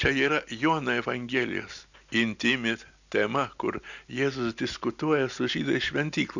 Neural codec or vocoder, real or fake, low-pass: none; real; 7.2 kHz